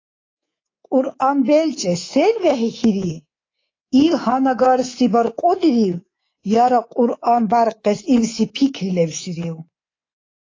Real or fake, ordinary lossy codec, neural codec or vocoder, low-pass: fake; AAC, 32 kbps; codec, 24 kHz, 3.1 kbps, DualCodec; 7.2 kHz